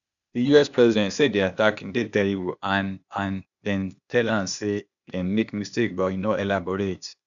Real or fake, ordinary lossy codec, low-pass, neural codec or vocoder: fake; none; 7.2 kHz; codec, 16 kHz, 0.8 kbps, ZipCodec